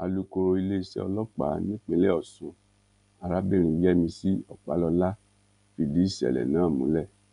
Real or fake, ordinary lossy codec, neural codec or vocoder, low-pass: real; none; none; 10.8 kHz